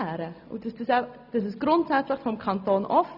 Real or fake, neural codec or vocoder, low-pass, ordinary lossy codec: real; none; 5.4 kHz; none